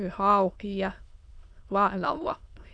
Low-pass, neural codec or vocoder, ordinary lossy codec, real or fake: 9.9 kHz; autoencoder, 22.05 kHz, a latent of 192 numbers a frame, VITS, trained on many speakers; Opus, 64 kbps; fake